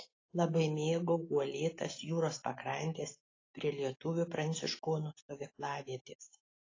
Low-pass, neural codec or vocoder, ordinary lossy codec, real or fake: 7.2 kHz; none; AAC, 32 kbps; real